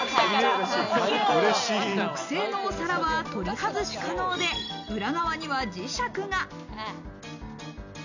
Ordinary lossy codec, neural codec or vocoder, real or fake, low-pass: none; none; real; 7.2 kHz